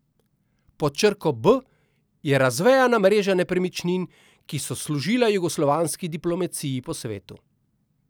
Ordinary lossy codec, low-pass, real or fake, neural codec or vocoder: none; none; real; none